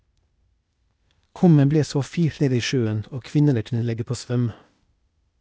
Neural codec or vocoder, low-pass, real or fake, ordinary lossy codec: codec, 16 kHz, 0.8 kbps, ZipCodec; none; fake; none